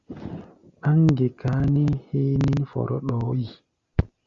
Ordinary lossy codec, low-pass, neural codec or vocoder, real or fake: Opus, 64 kbps; 7.2 kHz; none; real